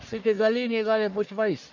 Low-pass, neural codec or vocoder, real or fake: 7.2 kHz; codec, 44.1 kHz, 1.7 kbps, Pupu-Codec; fake